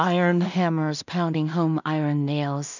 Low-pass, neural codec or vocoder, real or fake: 7.2 kHz; codec, 16 kHz in and 24 kHz out, 0.4 kbps, LongCat-Audio-Codec, two codebook decoder; fake